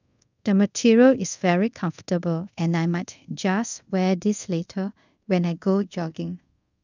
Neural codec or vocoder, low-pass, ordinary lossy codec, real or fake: codec, 24 kHz, 0.5 kbps, DualCodec; 7.2 kHz; none; fake